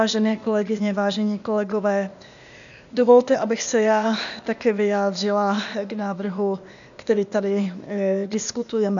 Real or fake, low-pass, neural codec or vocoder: fake; 7.2 kHz; codec, 16 kHz, 0.8 kbps, ZipCodec